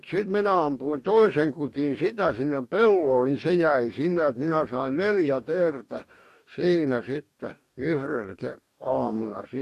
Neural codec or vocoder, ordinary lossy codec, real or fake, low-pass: codec, 44.1 kHz, 2.6 kbps, DAC; MP3, 64 kbps; fake; 14.4 kHz